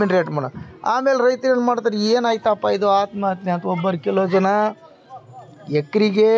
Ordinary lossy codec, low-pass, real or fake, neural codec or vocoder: none; none; real; none